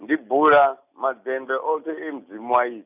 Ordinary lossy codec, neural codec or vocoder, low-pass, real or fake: none; none; 3.6 kHz; real